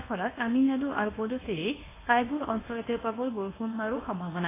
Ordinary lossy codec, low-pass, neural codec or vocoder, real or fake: AAC, 16 kbps; 3.6 kHz; codec, 24 kHz, 0.9 kbps, WavTokenizer, medium speech release version 1; fake